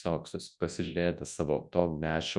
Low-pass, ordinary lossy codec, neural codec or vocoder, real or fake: 10.8 kHz; Opus, 64 kbps; codec, 24 kHz, 0.9 kbps, WavTokenizer, large speech release; fake